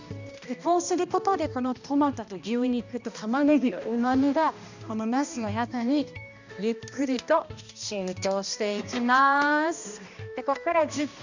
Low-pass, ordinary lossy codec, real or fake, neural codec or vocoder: 7.2 kHz; none; fake; codec, 16 kHz, 1 kbps, X-Codec, HuBERT features, trained on balanced general audio